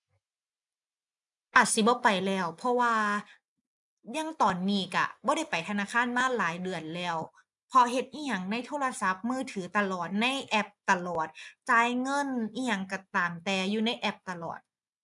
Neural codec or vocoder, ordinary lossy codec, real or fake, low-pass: none; none; real; 10.8 kHz